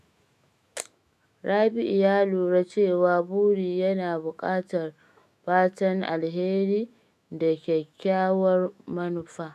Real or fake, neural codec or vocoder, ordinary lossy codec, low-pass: fake; autoencoder, 48 kHz, 128 numbers a frame, DAC-VAE, trained on Japanese speech; none; 14.4 kHz